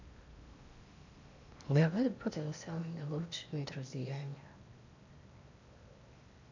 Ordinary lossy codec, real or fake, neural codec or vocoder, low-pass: AAC, 48 kbps; fake; codec, 16 kHz in and 24 kHz out, 0.8 kbps, FocalCodec, streaming, 65536 codes; 7.2 kHz